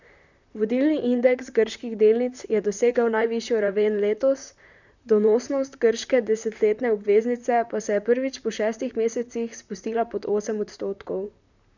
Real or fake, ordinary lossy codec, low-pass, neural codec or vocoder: fake; none; 7.2 kHz; vocoder, 44.1 kHz, 128 mel bands, Pupu-Vocoder